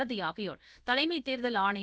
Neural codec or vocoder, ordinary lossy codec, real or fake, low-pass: codec, 16 kHz, about 1 kbps, DyCAST, with the encoder's durations; none; fake; none